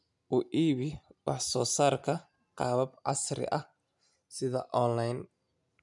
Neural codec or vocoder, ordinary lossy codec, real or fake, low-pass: none; none; real; 10.8 kHz